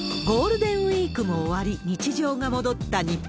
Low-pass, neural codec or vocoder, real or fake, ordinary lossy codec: none; none; real; none